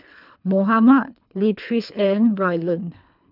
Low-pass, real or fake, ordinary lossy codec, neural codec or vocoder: 5.4 kHz; fake; none; codec, 24 kHz, 3 kbps, HILCodec